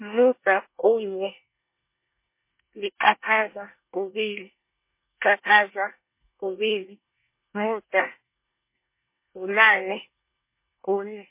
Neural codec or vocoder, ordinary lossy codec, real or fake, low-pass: codec, 24 kHz, 1 kbps, SNAC; MP3, 24 kbps; fake; 3.6 kHz